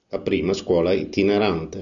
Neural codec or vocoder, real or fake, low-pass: none; real; 7.2 kHz